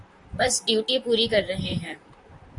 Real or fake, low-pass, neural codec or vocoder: fake; 10.8 kHz; vocoder, 44.1 kHz, 128 mel bands, Pupu-Vocoder